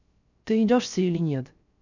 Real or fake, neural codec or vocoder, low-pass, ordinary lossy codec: fake; codec, 16 kHz, 0.3 kbps, FocalCodec; 7.2 kHz; none